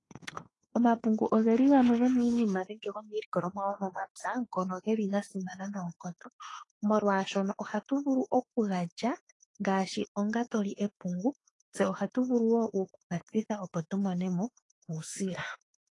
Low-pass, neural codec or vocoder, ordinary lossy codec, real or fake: 10.8 kHz; autoencoder, 48 kHz, 32 numbers a frame, DAC-VAE, trained on Japanese speech; AAC, 32 kbps; fake